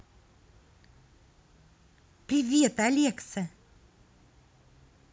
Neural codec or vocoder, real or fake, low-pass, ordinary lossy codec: none; real; none; none